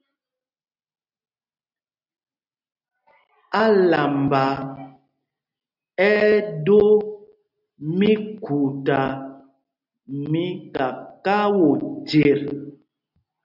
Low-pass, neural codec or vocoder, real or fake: 5.4 kHz; none; real